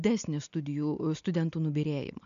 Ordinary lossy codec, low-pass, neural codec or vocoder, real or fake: MP3, 96 kbps; 7.2 kHz; none; real